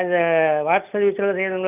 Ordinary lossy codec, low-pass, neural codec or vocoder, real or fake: none; 3.6 kHz; none; real